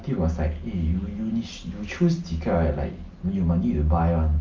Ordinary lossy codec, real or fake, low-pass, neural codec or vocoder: Opus, 32 kbps; real; 7.2 kHz; none